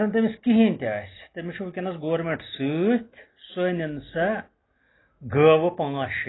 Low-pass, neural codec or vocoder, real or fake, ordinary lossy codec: 7.2 kHz; none; real; AAC, 16 kbps